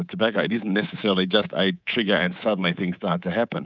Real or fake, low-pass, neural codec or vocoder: fake; 7.2 kHz; codec, 24 kHz, 3.1 kbps, DualCodec